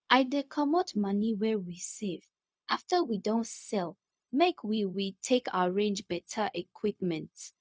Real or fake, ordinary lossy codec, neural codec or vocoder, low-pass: fake; none; codec, 16 kHz, 0.4 kbps, LongCat-Audio-Codec; none